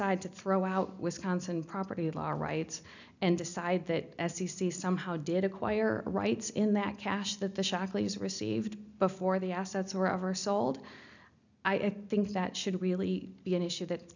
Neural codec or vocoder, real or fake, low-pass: none; real; 7.2 kHz